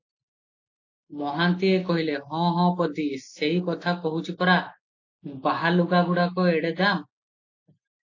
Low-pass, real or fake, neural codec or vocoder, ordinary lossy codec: 7.2 kHz; real; none; AAC, 48 kbps